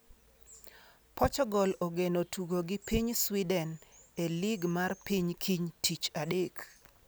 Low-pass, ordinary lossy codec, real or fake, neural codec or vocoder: none; none; real; none